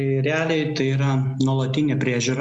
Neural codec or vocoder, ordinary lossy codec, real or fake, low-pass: none; Opus, 64 kbps; real; 10.8 kHz